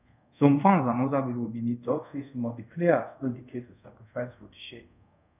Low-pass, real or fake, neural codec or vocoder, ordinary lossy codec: 3.6 kHz; fake; codec, 24 kHz, 0.5 kbps, DualCodec; none